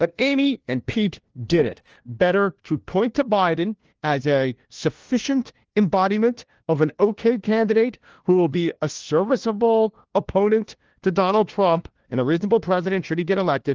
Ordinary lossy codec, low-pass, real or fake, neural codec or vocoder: Opus, 16 kbps; 7.2 kHz; fake; codec, 16 kHz, 1 kbps, FunCodec, trained on LibriTTS, 50 frames a second